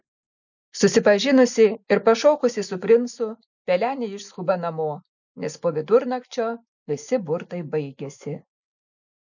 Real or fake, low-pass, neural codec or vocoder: real; 7.2 kHz; none